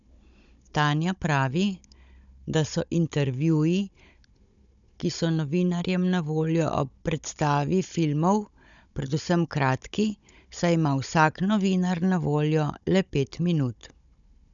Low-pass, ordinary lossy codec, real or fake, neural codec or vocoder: 7.2 kHz; none; fake; codec, 16 kHz, 16 kbps, FunCodec, trained on Chinese and English, 50 frames a second